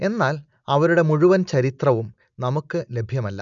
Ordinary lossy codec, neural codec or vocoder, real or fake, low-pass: none; none; real; 7.2 kHz